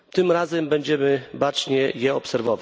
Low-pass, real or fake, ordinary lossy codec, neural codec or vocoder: none; real; none; none